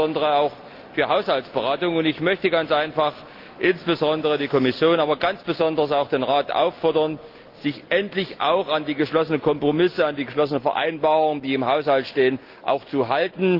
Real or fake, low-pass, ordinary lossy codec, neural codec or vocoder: real; 5.4 kHz; Opus, 32 kbps; none